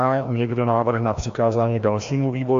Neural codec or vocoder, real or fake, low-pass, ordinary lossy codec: codec, 16 kHz, 1 kbps, FreqCodec, larger model; fake; 7.2 kHz; AAC, 64 kbps